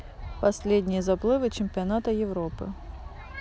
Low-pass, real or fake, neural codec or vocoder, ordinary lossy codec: none; real; none; none